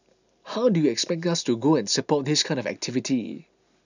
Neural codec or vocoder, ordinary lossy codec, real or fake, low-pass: none; none; real; 7.2 kHz